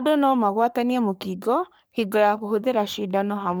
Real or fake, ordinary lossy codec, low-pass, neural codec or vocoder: fake; none; none; codec, 44.1 kHz, 3.4 kbps, Pupu-Codec